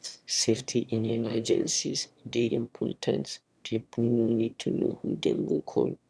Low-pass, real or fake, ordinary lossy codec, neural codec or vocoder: none; fake; none; autoencoder, 22.05 kHz, a latent of 192 numbers a frame, VITS, trained on one speaker